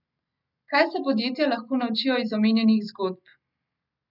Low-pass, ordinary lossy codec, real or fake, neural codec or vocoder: 5.4 kHz; none; real; none